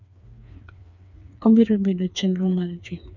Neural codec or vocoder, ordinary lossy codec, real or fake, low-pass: codec, 16 kHz, 4 kbps, FreqCodec, smaller model; none; fake; 7.2 kHz